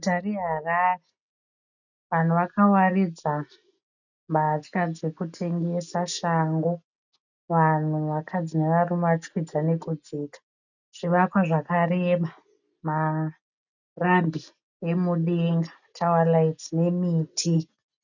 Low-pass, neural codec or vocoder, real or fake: 7.2 kHz; none; real